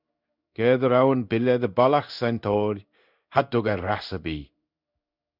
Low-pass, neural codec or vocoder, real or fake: 5.4 kHz; codec, 16 kHz in and 24 kHz out, 1 kbps, XY-Tokenizer; fake